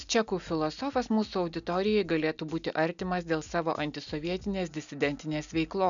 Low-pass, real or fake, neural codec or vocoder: 7.2 kHz; real; none